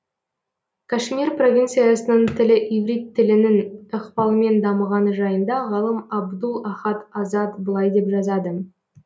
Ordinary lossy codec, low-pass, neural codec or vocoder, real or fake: none; none; none; real